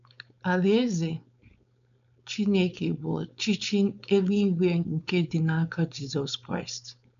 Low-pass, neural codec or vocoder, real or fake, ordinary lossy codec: 7.2 kHz; codec, 16 kHz, 4.8 kbps, FACodec; fake; none